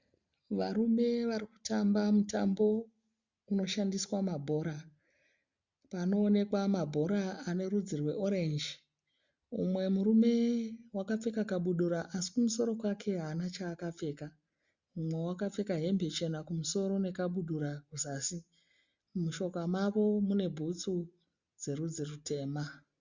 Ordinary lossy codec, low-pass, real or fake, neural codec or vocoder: Opus, 64 kbps; 7.2 kHz; real; none